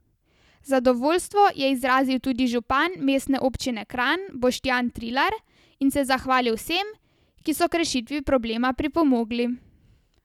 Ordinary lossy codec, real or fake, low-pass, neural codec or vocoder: none; real; 19.8 kHz; none